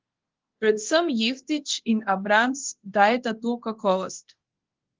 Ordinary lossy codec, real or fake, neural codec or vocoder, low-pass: Opus, 16 kbps; fake; codec, 24 kHz, 0.9 kbps, DualCodec; 7.2 kHz